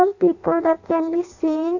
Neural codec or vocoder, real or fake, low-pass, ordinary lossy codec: codec, 16 kHz in and 24 kHz out, 0.6 kbps, FireRedTTS-2 codec; fake; 7.2 kHz; none